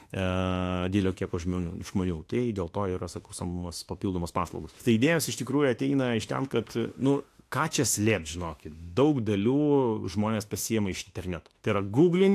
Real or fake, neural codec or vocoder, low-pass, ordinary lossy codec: fake; autoencoder, 48 kHz, 32 numbers a frame, DAC-VAE, trained on Japanese speech; 14.4 kHz; AAC, 64 kbps